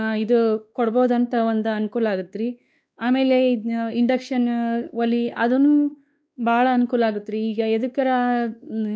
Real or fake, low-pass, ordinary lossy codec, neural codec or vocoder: fake; none; none; codec, 16 kHz, 2 kbps, X-Codec, WavLM features, trained on Multilingual LibriSpeech